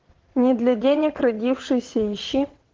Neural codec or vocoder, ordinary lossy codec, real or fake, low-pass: vocoder, 22.05 kHz, 80 mel bands, WaveNeXt; Opus, 16 kbps; fake; 7.2 kHz